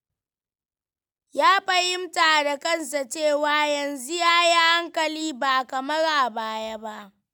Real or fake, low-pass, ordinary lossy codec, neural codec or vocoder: real; 19.8 kHz; none; none